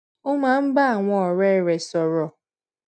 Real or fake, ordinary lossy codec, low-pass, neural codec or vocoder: real; none; none; none